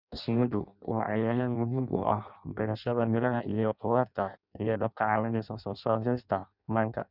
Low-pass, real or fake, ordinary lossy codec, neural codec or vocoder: 5.4 kHz; fake; none; codec, 16 kHz in and 24 kHz out, 0.6 kbps, FireRedTTS-2 codec